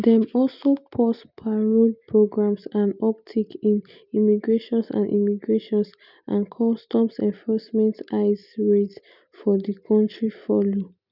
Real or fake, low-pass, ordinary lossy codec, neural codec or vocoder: real; 5.4 kHz; none; none